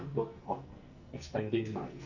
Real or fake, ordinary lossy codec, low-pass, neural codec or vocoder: fake; Opus, 64 kbps; 7.2 kHz; codec, 44.1 kHz, 2.6 kbps, SNAC